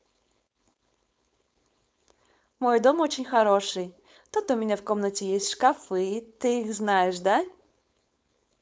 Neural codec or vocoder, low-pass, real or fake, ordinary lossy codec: codec, 16 kHz, 4.8 kbps, FACodec; none; fake; none